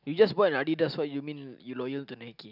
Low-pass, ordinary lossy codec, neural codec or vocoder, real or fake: 5.4 kHz; none; none; real